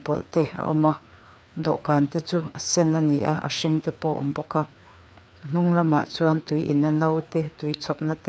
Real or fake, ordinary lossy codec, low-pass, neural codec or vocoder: fake; none; none; codec, 16 kHz, 2 kbps, FreqCodec, larger model